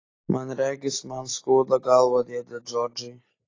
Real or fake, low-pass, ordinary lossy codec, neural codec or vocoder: real; 7.2 kHz; AAC, 32 kbps; none